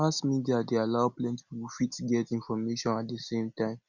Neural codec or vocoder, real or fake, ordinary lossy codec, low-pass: none; real; none; 7.2 kHz